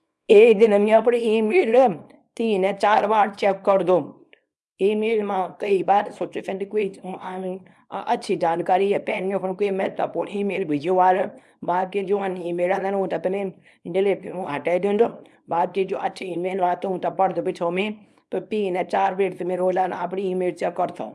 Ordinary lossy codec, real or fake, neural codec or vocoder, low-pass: none; fake; codec, 24 kHz, 0.9 kbps, WavTokenizer, small release; none